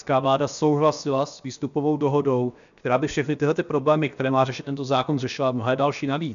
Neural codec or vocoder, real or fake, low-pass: codec, 16 kHz, 0.7 kbps, FocalCodec; fake; 7.2 kHz